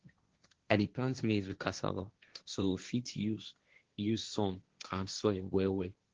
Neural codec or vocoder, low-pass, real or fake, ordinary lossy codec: codec, 16 kHz, 1.1 kbps, Voila-Tokenizer; 7.2 kHz; fake; Opus, 16 kbps